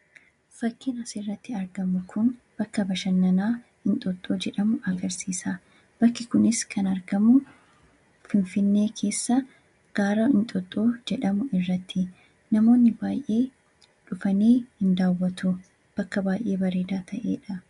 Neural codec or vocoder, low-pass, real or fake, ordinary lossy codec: none; 10.8 kHz; real; MP3, 64 kbps